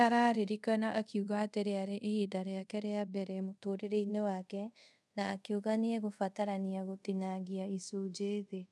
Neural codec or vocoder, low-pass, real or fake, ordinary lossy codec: codec, 24 kHz, 0.5 kbps, DualCodec; none; fake; none